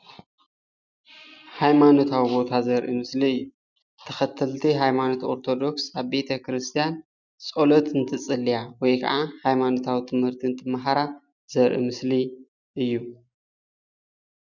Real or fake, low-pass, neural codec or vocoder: real; 7.2 kHz; none